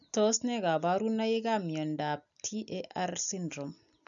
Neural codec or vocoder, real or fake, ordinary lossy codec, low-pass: none; real; none; 7.2 kHz